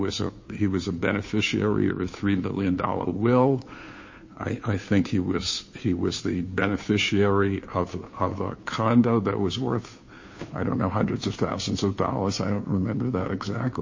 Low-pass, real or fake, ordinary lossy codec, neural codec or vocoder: 7.2 kHz; fake; MP3, 32 kbps; codec, 16 kHz, 2 kbps, FunCodec, trained on Chinese and English, 25 frames a second